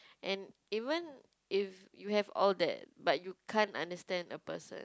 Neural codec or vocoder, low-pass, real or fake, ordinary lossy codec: none; none; real; none